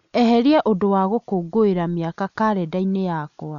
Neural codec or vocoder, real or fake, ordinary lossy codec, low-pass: none; real; none; 7.2 kHz